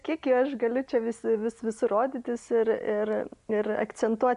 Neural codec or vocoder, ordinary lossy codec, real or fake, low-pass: none; MP3, 64 kbps; real; 10.8 kHz